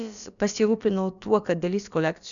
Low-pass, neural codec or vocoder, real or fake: 7.2 kHz; codec, 16 kHz, about 1 kbps, DyCAST, with the encoder's durations; fake